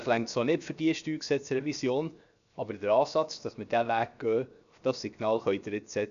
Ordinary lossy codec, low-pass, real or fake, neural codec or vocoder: none; 7.2 kHz; fake; codec, 16 kHz, 0.7 kbps, FocalCodec